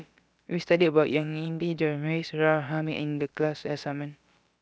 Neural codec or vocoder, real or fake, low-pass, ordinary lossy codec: codec, 16 kHz, about 1 kbps, DyCAST, with the encoder's durations; fake; none; none